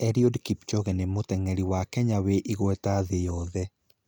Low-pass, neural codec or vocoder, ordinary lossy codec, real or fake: none; none; none; real